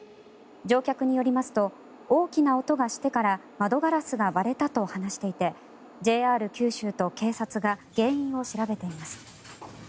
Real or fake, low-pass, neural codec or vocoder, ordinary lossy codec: real; none; none; none